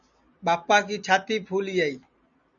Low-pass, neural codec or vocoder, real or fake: 7.2 kHz; none; real